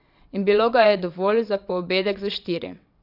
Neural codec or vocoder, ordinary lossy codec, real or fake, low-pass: vocoder, 22.05 kHz, 80 mel bands, WaveNeXt; none; fake; 5.4 kHz